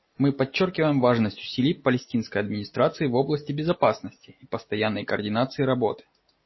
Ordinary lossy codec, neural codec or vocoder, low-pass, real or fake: MP3, 24 kbps; none; 7.2 kHz; real